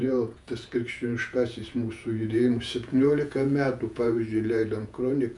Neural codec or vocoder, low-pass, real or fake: vocoder, 48 kHz, 128 mel bands, Vocos; 10.8 kHz; fake